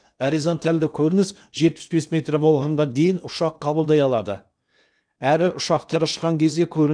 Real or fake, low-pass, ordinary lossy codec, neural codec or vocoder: fake; 9.9 kHz; none; codec, 16 kHz in and 24 kHz out, 0.8 kbps, FocalCodec, streaming, 65536 codes